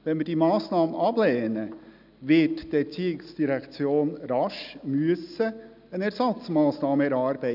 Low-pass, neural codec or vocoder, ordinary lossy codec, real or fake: 5.4 kHz; none; none; real